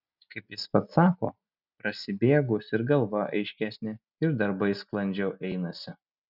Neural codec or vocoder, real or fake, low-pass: none; real; 5.4 kHz